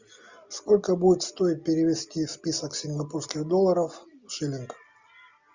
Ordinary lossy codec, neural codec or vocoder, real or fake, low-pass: Opus, 64 kbps; none; real; 7.2 kHz